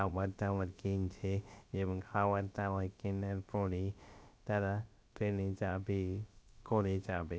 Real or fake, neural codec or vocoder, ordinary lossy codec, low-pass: fake; codec, 16 kHz, about 1 kbps, DyCAST, with the encoder's durations; none; none